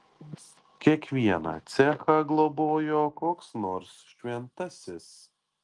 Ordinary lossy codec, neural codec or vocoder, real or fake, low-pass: Opus, 24 kbps; none; real; 10.8 kHz